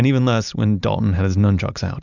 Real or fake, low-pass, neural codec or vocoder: real; 7.2 kHz; none